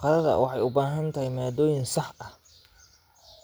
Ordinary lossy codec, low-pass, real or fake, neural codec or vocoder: none; none; real; none